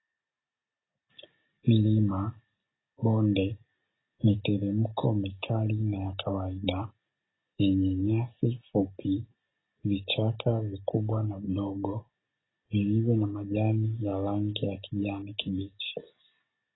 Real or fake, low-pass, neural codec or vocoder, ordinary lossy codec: real; 7.2 kHz; none; AAC, 16 kbps